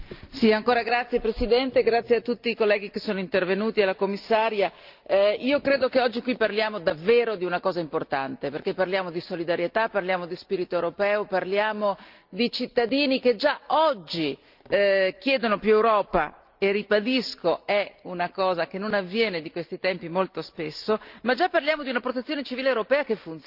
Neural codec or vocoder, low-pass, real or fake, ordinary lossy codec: none; 5.4 kHz; real; Opus, 24 kbps